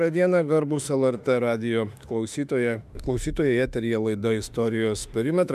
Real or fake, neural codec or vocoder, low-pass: fake; autoencoder, 48 kHz, 32 numbers a frame, DAC-VAE, trained on Japanese speech; 14.4 kHz